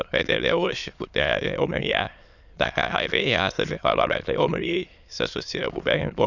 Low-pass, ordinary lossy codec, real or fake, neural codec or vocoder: 7.2 kHz; none; fake; autoencoder, 22.05 kHz, a latent of 192 numbers a frame, VITS, trained on many speakers